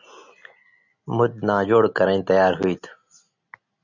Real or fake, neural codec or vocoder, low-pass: real; none; 7.2 kHz